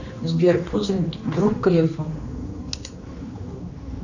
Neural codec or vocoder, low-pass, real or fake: codec, 16 kHz, 2 kbps, X-Codec, HuBERT features, trained on general audio; 7.2 kHz; fake